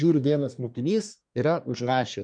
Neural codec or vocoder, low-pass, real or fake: codec, 24 kHz, 1 kbps, SNAC; 9.9 kHz; fake